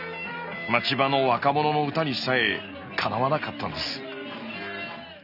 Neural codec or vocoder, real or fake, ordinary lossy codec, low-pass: none; real; none; 5.4 kHz